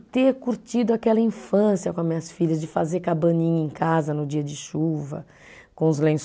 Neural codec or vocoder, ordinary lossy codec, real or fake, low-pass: none; none; real; none